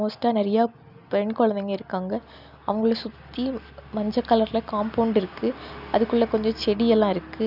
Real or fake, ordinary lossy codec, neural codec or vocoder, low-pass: real; none; none; 5.4 kHz